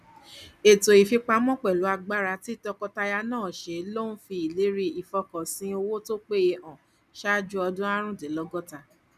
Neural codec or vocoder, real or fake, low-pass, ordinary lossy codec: none; real; 14.4 kHz; none